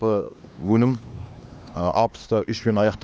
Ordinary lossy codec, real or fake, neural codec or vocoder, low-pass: none; fake; codec, 16 kHz, 2 kbps, X-Codec, HuBERT features, trained on LibriSpeech; none